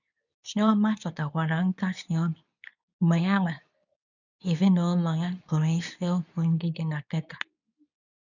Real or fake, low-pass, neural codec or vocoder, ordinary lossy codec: fake; 7.2 kHz; codec, 24 kHz, 0.9 kbps, WavTokenizer, medium speech release version 2; none